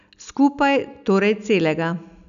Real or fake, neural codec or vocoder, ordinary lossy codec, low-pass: real; none; none; 7.2 kHz